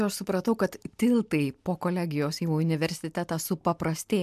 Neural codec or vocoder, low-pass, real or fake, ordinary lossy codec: none; 14.4 kHz; real; MP3, 96 kbps